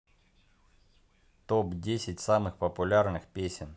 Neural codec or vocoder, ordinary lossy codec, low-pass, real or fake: none; none; none; real